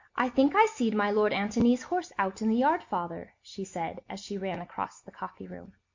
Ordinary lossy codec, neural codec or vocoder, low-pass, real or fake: MP3, 48 kbps; none; 7.2 kHz; real